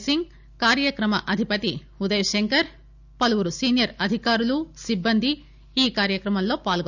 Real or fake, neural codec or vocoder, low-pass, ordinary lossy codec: real; none; 7.2 kHz; none